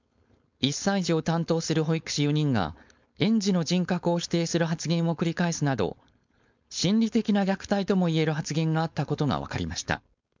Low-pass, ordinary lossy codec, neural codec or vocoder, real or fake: 7.2 kHz; MP3, 64 kbps; codec, 16 kHz, 4.8 kbps, FACodec; fake